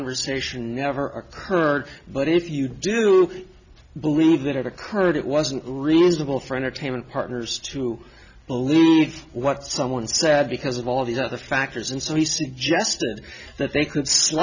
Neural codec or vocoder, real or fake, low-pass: none; real; 7.2 kHz